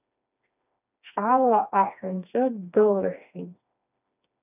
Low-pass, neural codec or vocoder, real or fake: 3.6 kHz; codec, 16 kHz, 2 kbps, FreqCodec, smaller model; fake